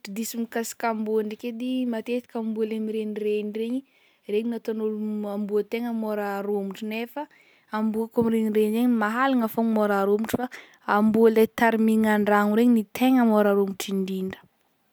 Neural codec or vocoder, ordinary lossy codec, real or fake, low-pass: none; none; real; none